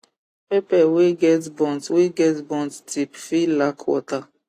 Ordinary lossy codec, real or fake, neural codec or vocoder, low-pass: AAC, 48 kbps; real; none; 14.4 kHz